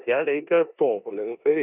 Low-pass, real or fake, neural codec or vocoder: 3.6 kHz; fake; codec, 16 kHz in and 24 kHz out, 0.9 kbps, LongCat-Audio-Codec, four codebook decoder